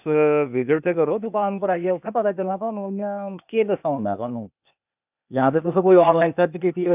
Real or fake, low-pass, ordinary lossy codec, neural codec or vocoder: fake; 3.6 kHz; none; codec, 16 kHz, 0.8 kbps, ZipCodec